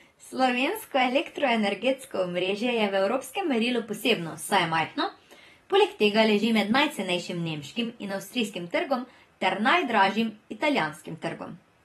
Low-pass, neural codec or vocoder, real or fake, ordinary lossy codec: 19.8 kHz; vocoder, 44.1 kHz, 128 mel bands every 256 samples, BigVGAN v2; fake; AAC, 32 kbps